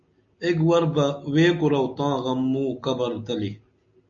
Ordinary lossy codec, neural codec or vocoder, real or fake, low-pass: MP3, 48 kbps; none; real; 7.2 kHz